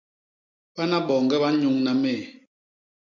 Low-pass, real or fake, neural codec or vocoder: 7.2 kHz; real; none